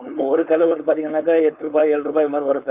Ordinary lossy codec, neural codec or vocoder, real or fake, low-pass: none; codec, 16 kHz, 4.8 kbps, FACodec; fake; 3.6 kHz